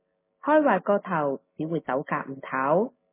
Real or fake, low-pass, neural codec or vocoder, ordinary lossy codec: real; 3.6 kHz; none; MP3, 16 kbps